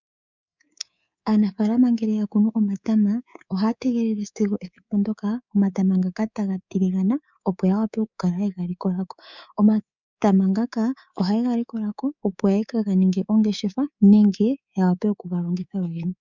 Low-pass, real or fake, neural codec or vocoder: 7.2 kHz; fake; codec, 24 kHz, 3.1 kbps, DualCodec